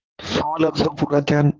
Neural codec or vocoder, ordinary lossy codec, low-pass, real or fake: codec, 16 kHz in and 24 kHz out, 2.2 kbps, FireRedTTS-2 codec; Opus, 16 kbps; 7.2 kHz; fake